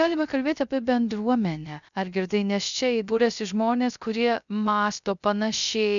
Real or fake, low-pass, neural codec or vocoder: fake; 7.2 kHz; codec, 16 kHz, 0.3 kbps, FocalCodec